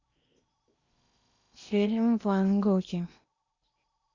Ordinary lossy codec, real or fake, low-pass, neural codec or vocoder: Opus, 64 kbps; fake; 7.2 kHz; codec, 16 kHz in and 24 kHz out, 0.8 kbps, FocalCodec, streaming, 65536 codes